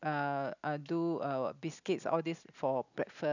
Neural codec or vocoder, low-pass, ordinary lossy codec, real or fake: none; 7.2 kHz; none; real